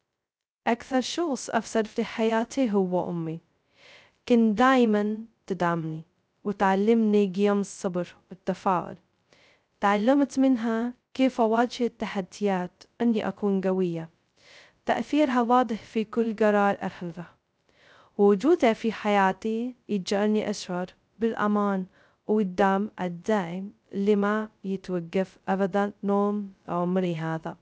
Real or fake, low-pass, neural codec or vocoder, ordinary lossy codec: fake; none; codec, 16 kHz, 0.2 kbps, FocalCodec; none